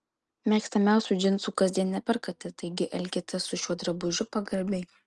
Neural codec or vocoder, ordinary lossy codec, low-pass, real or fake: none; Opus, 24 kbps; 10.8 kHz; real